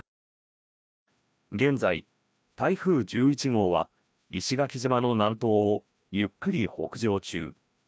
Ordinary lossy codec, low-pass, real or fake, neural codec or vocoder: none; none; fake; codec, 16 kHz, 1 kbps, FreqCodec, larger model